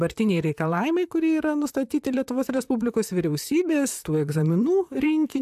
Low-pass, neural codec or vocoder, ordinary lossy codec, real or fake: 14.4 kHz; vocoder, 44.1 kHz, 128 mel bands, Pupu-Vocoder; AAC, 96 kbps; fake